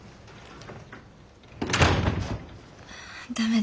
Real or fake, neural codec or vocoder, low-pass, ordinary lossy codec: real; none; none; none